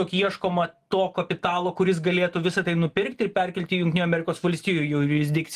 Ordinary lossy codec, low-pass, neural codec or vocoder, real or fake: Opus, 24 kbps; 14.4 kHz; none; real